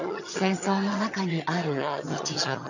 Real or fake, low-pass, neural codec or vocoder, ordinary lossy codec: fake; 7.2 kHz; vocoder, 22.05 kHz, 80 mel bands, HiFi-GAN; none